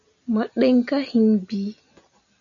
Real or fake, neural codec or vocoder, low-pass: real; none; 7.2 kHz